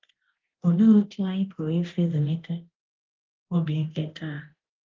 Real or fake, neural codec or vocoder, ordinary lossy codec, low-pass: fake; codec, 24 kHz, 0.9 kbps, DualCodec; Opus, 16 kbps; 7.2 kHz